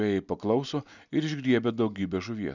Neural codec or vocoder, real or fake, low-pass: none; real; 7.2 kHz